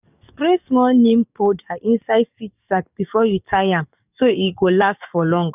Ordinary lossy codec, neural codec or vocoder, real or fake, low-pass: none; codec, 44.1 kHz, 7.8 kbps, DAC; fake; 3.6 kHz